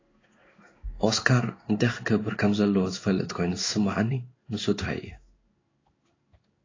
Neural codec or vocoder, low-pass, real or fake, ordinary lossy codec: codec, 16 kHz in and 24 kHz out, 1 kbps, XY-Tokenizer; 7.2 kHz; fake; AAC, 32 kbps